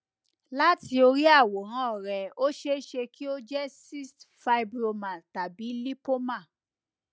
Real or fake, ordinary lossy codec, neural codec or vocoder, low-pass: real; none; none; none